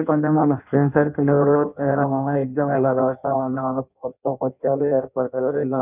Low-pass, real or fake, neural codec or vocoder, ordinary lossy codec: 3.6 kHz; fake; codec, 16 kHz in and 24 kHz out, 0.6 kbps, FireRedTTS-2 codec; none